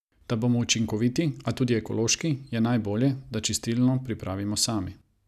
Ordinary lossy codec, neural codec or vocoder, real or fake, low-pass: none; none; real; 14.4 kHz